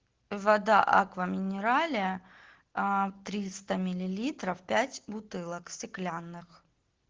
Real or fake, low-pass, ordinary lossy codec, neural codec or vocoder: real; 7.2 kHz; Opus, 16 kbps; none